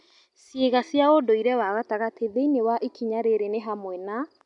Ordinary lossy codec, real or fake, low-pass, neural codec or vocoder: none; real; 10.8 kHz; none